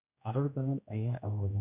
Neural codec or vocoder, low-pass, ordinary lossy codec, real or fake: codec, 16 kHz, 1 kbps, X-Codec, HuBERT features, trained on general audio; 3.6 kHz; none; fake